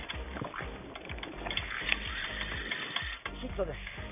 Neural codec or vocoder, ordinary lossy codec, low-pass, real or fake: none; none; 3.6 kHz; real